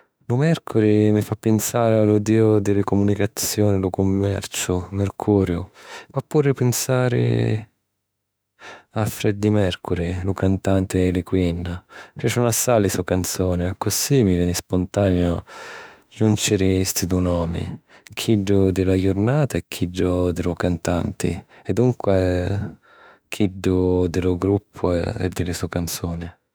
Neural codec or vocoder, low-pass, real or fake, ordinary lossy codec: autoencoder, 48 kHz, 32 numbers a frame, DAC-VAE, trained on Japanese speech; none; fake; none